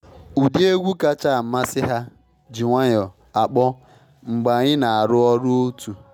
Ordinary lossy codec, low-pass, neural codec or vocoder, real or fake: none; 19.8 kHz; none; real